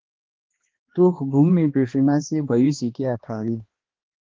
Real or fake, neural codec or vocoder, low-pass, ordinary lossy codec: fake; codec, 16 kHz, 2 kbps, X-Codec, HuBERT features, trained on balanced general audio; 7.2 kHz; Opus, 16 kbps